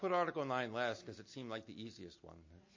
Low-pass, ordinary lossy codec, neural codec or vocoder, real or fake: 7.2 kHz; MP3, 32 kbps; none; real